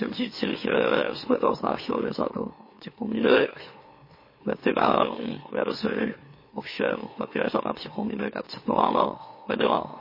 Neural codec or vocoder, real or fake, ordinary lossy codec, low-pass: autoencoder, 44.1 kHz, a latent of 192 numbers a frame, MeloTTS; fake; MP3, 24 kbps; 5.4 kHz